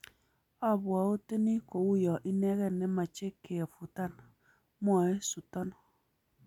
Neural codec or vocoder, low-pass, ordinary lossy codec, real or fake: none; 19.8 kHz; none; real